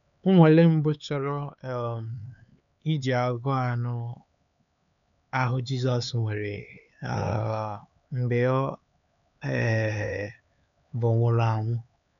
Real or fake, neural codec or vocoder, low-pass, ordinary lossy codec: fake; codec, 16 kHz, 4 kbps, X-Codec, HuBERT features, trained on LibriSpeech; 7.2 kHz; none